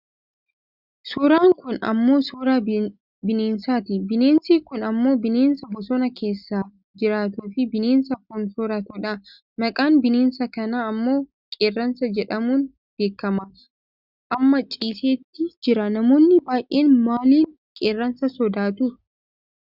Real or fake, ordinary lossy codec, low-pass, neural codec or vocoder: real; Opus, 64 kbps; 5.4 kHz; none